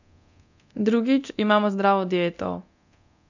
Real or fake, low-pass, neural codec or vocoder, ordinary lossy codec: fake; 7.2 kHz; codec, 24 kHz, 0.9 kbps, DualCodec; none